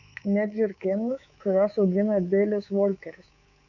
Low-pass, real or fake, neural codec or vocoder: 7.2 kHz; fake; codec, 24 kHz, 3.1 kbps, DualCodec